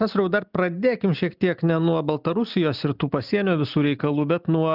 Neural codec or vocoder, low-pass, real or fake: none; 5.4 kHz; real